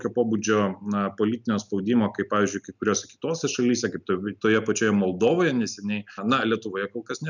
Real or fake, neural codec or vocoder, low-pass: real; none; 7.2 kHz